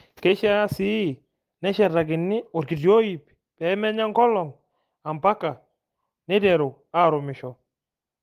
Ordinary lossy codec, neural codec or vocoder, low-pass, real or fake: Opus, 24 kbps; none; 14.4 kHz; real